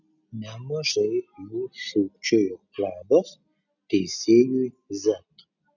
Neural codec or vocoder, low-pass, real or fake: none; 7.2 kHz; real